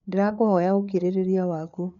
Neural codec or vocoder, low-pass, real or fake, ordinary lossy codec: codec, 16 kHz, 4 kbps, FreqCodec, larger model; 7.2 kHz; fake; none